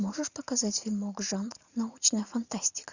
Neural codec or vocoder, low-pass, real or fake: vocoder, 44.1 kHz, 80 mel bands, Vocos; 7.2 kHz; fake